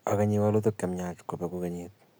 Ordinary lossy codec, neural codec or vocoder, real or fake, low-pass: none; none; real; none